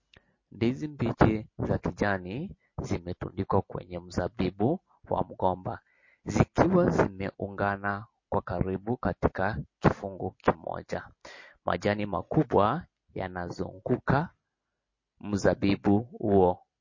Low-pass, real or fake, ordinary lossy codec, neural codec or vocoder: 7.2 kHz; real; MP3, 32 kbps; none